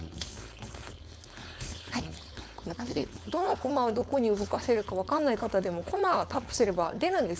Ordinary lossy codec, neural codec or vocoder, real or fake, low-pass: none; codec, 16 kHz, 4.8 kbps, FACodec; fake; none